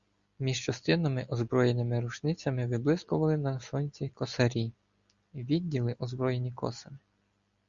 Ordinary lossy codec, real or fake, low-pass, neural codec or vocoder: Opus, 64 kbps; real; 7.2 kHz; none